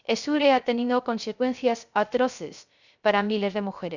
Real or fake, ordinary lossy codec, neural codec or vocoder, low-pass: fake; none; codec, 16 kHz, 0.3 kbps, FocalCodec; 7.2 kHz